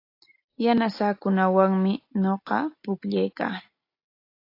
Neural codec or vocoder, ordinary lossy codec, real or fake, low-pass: none; AAC, 32 kbps; real; 5.4 kHz